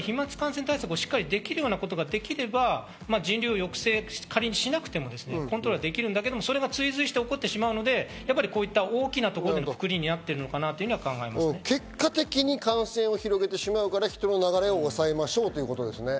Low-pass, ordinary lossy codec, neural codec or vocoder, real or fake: none; none; none; real